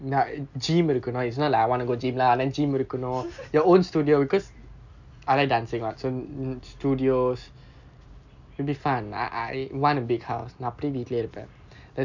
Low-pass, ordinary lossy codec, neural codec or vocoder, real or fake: 7.2 kHz; none; none; real